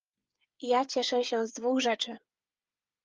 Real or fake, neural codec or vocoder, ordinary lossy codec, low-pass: fake; codec, 16 kHz, 8 kbps, FreqCodec, smaller model; Opus, 24 kbps; 7.2 kHz